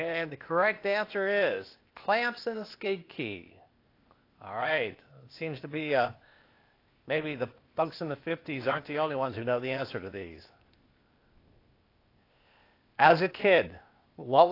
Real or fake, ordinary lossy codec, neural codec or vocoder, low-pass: fake; AAC, 32 kbps; codec, 16 kHz, 0.8 kbps, ZipCodec; 5.4 kHz